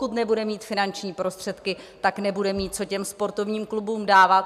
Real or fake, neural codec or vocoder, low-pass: real; none; 14.4 kHz